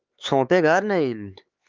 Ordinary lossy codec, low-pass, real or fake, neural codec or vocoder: Opus, 32 kbps; 7.2 kHz; fake; codec, 16 kHz, 4 kbps, X-Codec, HuBERT features, trained on LibriSpeech